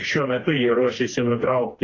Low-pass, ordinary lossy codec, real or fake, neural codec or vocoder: 7.2 kHz; AAC, 32 kbps; fake; codec, 16 kHz, 2 kbps, FreqCodec, smaller model